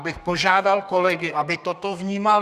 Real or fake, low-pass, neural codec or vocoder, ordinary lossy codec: fake; 14.4 kHz; codec, 32 kHz, 1.9 kbps, SNAC; Opus, 64 kbps